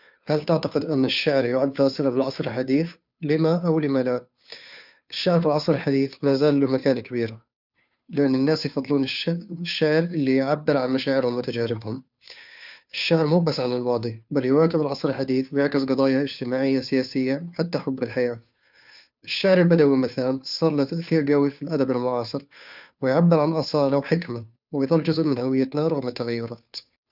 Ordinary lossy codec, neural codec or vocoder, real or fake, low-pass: none; codec, 16 kHz, 2 kbps, FunCodec, trained on Chinese and English, 25 frames a second; fake; 5.4 kHz